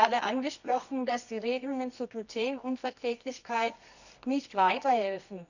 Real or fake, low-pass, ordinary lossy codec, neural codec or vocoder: fake; 7.2 kHz; none; codec, 24 kHz, 0.9 kbps, WavTokenizer, medium music audio release